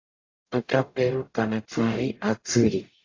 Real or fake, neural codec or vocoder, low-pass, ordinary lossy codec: fake; codec, 44.1 kHz, 0.9 kbps, DAC; 7.2 kHz; AAC, 32 kbps